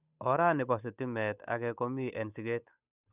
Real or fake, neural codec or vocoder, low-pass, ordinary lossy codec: fake; codec, 16 kHz, 8 kbps, FunCodec, trained on Chinese and English, 25 frames a second; 3.6 kHz; none